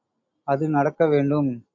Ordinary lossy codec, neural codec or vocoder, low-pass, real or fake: AAC, 48 kbps; none; 7.2 kHz; real